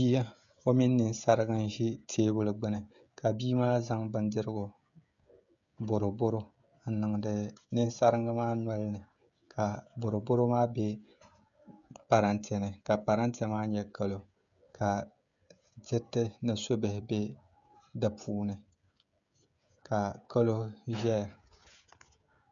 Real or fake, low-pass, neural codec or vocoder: fake; 7.2 kHz; codec, 16 kHz, 16 kbps, FreqCodec, smaller model